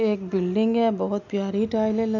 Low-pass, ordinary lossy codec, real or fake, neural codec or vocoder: 7.2 kHz; none; real; none